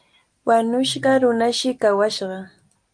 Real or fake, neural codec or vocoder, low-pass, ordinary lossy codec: real; none; 9.9 kHz; Opus, 32 kbps